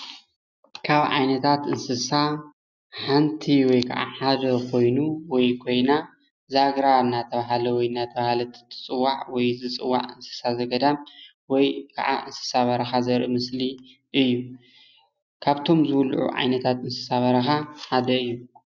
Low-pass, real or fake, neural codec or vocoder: 7.2 kHz; real; none